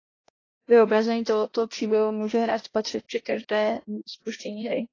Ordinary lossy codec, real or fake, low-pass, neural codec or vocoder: AAC, 32 kbps; fake; 7.2 kHz; codec, 16 kHz, 1 kbps, X-Codec, HuBERT features, trained on balanced general audio